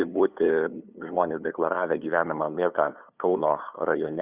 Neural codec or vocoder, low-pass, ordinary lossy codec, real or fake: codec, 16 kHz, 8 kbps, FunCodec, trained on LibriTTS, 25 frames a second; 3.6 kHz; Opus, 64 kbps; fake